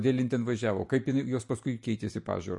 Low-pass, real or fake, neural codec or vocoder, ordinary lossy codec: 10.8 kHz; real; none; MP3, 48 kbps